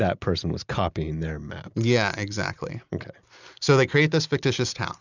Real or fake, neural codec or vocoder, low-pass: real; none; 7.2 kHz